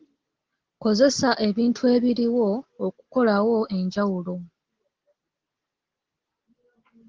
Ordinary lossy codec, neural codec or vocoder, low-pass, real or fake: Opus, 16 kbps; none; 7.2 kHz; real